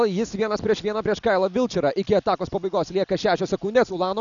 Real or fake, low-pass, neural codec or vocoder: real; 7.2 kHz; none